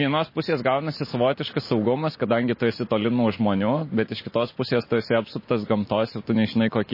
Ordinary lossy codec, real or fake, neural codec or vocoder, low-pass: MP3, 24 kbps; real; none; 5.4 kHz